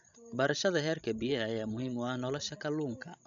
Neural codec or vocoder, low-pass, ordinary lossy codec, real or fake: codec, 16 kHz, 16 kbps, FreqCodec, larger model; 7.2 kHz; none; fake